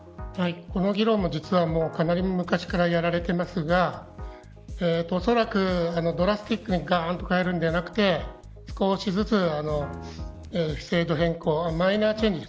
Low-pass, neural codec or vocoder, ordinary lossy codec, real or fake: none; none; none; real